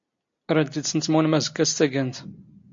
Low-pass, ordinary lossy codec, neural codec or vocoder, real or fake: 7.2 kHz; MP3, 48 kbps; none; real